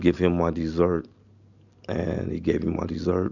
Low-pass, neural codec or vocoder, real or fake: 7.2 kHz; none; real